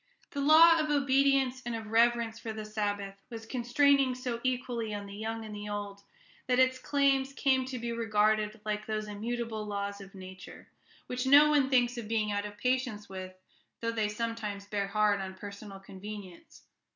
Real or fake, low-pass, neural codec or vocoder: real; 7.2 kHz; none